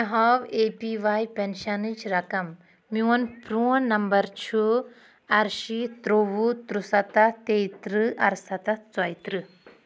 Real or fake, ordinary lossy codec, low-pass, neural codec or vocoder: real; none; none; none